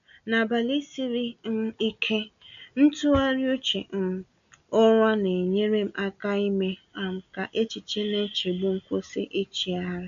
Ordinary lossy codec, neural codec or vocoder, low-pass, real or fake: MP3, 96 kbps; none; 7.2 kHz; real